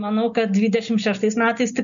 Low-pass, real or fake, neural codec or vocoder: 7.2 kHz; real; none